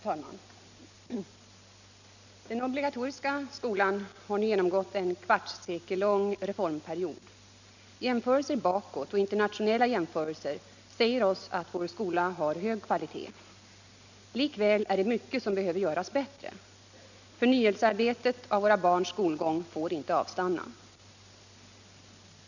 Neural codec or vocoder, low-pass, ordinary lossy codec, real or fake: none; 7.2 kHz; none; real